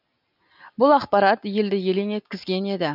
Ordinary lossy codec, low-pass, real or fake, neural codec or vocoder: none; 5.4 kHz; real; none